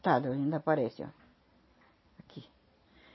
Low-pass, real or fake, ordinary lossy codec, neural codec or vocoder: 7.2 kHz; real; MP3, 24 kbps; none